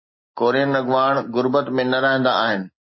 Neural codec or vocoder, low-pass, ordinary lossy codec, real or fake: none; 7.2 kHz; MP3, 24 kbps; real